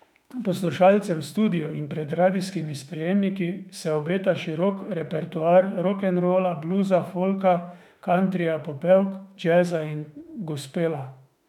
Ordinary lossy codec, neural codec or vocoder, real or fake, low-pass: none; autoencoder, 48 kHz, 32 numbers a frame, DAC-VAE, trained on Japanese speech; fake; 19.8 kHz